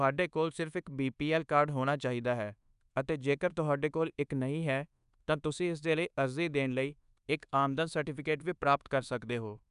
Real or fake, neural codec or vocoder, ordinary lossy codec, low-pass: fake; codec, 24 kHz, 1.2 kbps, DualCodec; none; 10.8 kHz